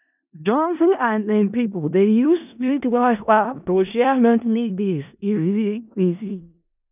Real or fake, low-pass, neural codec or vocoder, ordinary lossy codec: fake; 3.6 kHz; codec, 16 kHz in and 24 kHz out, 0.4 kbps, LongCat-Audio-Codec, four codebook decoder; none